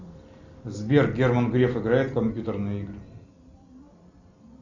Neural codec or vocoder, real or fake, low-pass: none; real; 7.2 kHz